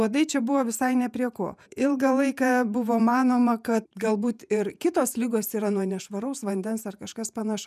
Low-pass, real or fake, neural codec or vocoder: 14.4 kHz; fake; vocoder, 48 kHz, 128 mel bands, Vocos